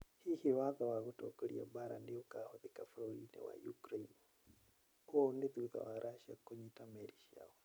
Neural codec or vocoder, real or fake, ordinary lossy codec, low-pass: none; real; none; none